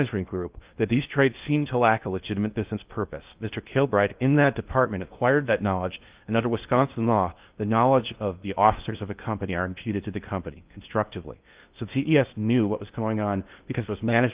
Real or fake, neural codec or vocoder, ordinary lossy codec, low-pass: fake; codec, 16 kHz in and 24 kHz out, 0.6 kbps, FocalCodec, streaming, 2048 codes; Opus, 32 kbps; 3.6 kHz